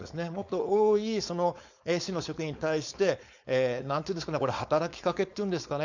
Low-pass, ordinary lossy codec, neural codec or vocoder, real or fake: 7.2 kHz; none; codec, 16 kHz, 4.8 kbps, FACodec; fake